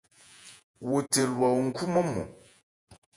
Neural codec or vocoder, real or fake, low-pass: vocoder, 48 kHz, 128 mel bands, Vocos; fake; 10.8 kHz